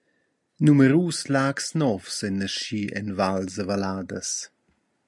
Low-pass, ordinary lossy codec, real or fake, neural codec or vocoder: 10.8 kHz; MP3, 96 kbps; real; none